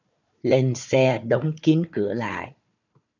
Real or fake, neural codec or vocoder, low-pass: fake; codec, 16 kHz, 4 kbps, FunCodec, trained on Chinese and English, 50 frames a second; 7.2 kHz